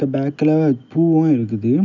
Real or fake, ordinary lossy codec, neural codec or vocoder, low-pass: real; none; none; 7.2 kHz